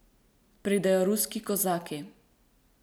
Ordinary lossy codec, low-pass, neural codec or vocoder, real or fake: none; none; none; real